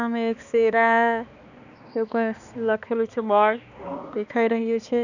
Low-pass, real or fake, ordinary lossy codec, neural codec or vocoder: 7.2 kHz; fake; none; codec, 16 kHz, 2 kbps, X-Codec, HuBERT features, trained on balanced general audio